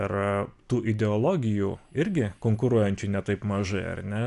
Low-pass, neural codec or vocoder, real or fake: 10.8 kHz; none; real